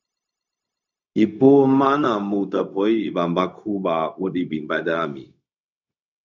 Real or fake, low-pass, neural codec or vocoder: fake; 7.2 kHz; codec, 16 kHz, 0.4 kbps, LongCat-Audio-Codec